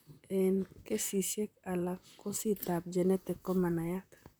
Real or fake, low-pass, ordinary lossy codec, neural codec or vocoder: fake; none; none; vocoder, 44.1 kHz, 128 mel bands, Pupu-Vocoder